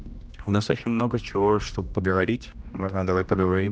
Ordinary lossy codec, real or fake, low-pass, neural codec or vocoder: none; fake; none; codec, 16 kHz, 1 kbps, X-Codec, HuBERT features, trained on general audio